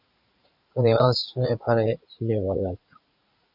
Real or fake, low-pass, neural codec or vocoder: fake; 5.4 kHz; vocoder, 44.1 kHz, 80 mel bands, Vocos